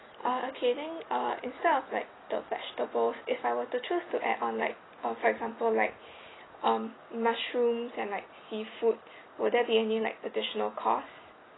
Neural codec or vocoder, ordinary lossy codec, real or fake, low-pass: none; AAC, 16 kbps; real; 7.2 kHz